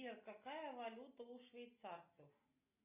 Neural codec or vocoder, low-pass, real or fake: none; 3.6 kHz; real